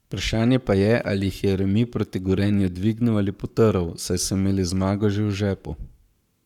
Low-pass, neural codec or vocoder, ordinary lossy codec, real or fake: 19.8 kHz; codec, 44.1 kHz, 7.8 kbps, Pupu-Codec; none; fake